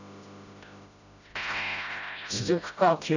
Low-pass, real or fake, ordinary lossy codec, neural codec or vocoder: 7.2 kHz; fake; none; codec, 16 kHz, 0.5 kbps, FreqCodec, smaller model